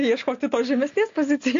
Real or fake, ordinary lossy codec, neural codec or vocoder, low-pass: real; AAC, 48 kbps; none; 7.2 kHz